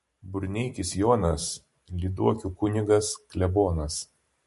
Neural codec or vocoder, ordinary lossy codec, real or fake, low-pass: vocoder, 44.1 kHz, 128 mel bands every 512 samples, BigVGAN v2; MP3, 48 kbps; fake; 14.4 kHz